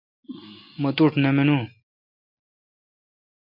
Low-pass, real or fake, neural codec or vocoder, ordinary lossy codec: 5.4 kHz; real; none; MP3, 32 kbps